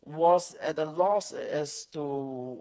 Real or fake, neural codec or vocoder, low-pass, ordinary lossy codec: fake; codec, 16 kHz, 4 kbps, FreqCodec, smaller model; none; none